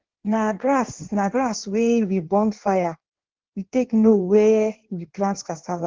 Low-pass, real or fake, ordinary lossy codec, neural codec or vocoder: 7.2 kHz; fake; Opus, 16 kbps; codec, 16 kHz in and 24 kHz out, 1.1 kbps, FireRedTTS-2 codec